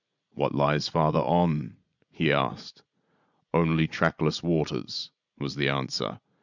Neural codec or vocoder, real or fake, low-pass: vocoder, 44.1 kHz, 80 mel bands, Vocos; fake; 7.2 kHz